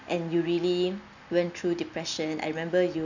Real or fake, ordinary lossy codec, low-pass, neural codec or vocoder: real; none; 7.2 kHz; none